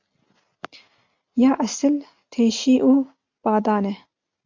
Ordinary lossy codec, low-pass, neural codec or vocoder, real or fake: MP3, 64 kbps; 7.2 kHz; none; real